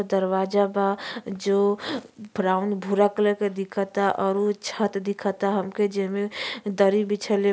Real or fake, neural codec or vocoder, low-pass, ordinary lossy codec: real; none; none; none